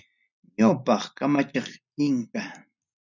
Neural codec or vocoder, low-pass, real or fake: none; 7.2 kHz; real